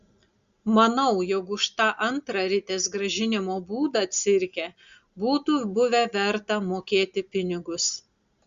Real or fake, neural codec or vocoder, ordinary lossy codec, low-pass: real; none; Opus, 64 kbps; 7.2 kHz